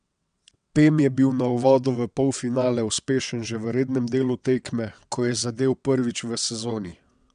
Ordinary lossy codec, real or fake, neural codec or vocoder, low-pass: MP3, 96 kbps; fake; vocoder, 22.05 kHz, 80 mel bands, WaveNeXt; 9.9 kHz